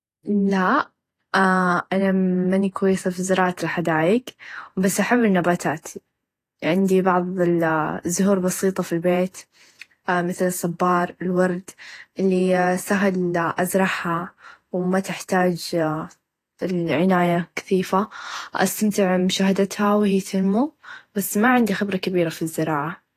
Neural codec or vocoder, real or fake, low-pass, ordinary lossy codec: vocoder, 48 kHz, 128 mel bands, Vocos; fake; 14.4 kHz; AAC, 48 kbps